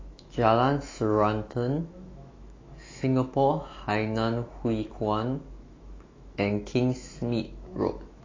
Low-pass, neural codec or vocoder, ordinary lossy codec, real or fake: 7.2 kHz; autoencoder, 48 kHz, 128 numbers a frame, DAC-VAE, trained on Japanese speech; AAC, 32 kbps; fake